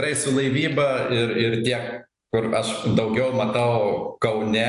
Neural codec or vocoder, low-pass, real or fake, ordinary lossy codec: none; 10.8 kHz; real; AAC, 96 kbps